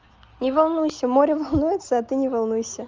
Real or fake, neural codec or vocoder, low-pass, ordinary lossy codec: real; none; 7.2 kHz; Opus, 24 kbps